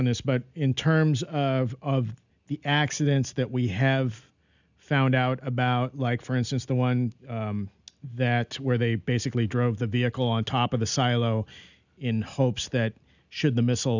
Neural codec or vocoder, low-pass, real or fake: none; 7.2 kHz; real